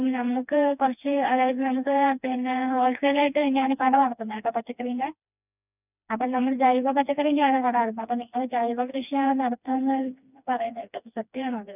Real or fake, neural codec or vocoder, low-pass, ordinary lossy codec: fake; codec, 16 kHz, 2 kbps, FreqCodec, smaller model; 3.6 kHz; none